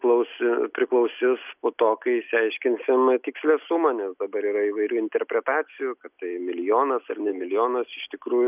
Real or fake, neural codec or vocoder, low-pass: real; none; 3.6 kHz